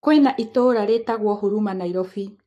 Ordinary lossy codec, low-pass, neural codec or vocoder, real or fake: AAC, 64 kbps; 14.4 kHz; codec, 44.1 kHz, 7.8 kbps, Pupu-Codec; fake